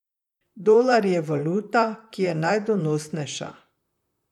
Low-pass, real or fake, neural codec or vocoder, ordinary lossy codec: 19.8 kHz; fake; vocoder, 44.1 kHz, 128 mel bands, Pupu-Vocoder; none